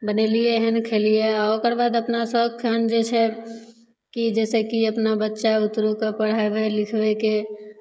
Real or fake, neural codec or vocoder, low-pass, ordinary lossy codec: fake; codec, 16 kHz, 16 kbps, FreqCodec, smaller model; none; none